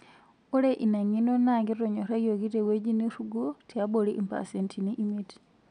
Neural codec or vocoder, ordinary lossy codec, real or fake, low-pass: none; none; real; 9.9 kHz